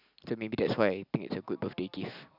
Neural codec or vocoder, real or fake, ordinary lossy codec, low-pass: none; real; none; 5.4 kHz